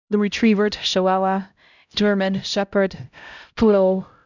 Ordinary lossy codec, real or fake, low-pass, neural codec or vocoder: none; fake; 7.2 kHz; codec, 16 kHz, 0.5 kbps, X-Codec, HuBERT features, trained on LibriSpeech